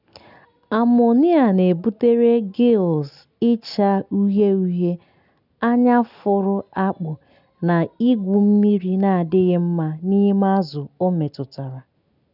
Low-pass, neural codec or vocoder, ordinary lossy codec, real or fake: 5.4 kHz; none; none; real